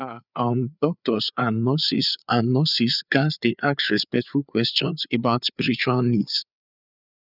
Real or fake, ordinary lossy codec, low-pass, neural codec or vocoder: fake; none; 5.4 kHz; codec, 16 kHz, 4 kbps, FreqCodec, larger model